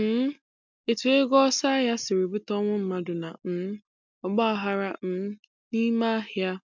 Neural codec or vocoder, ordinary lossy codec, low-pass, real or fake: none; MP3, 64 kbps; 7.2 kHz; real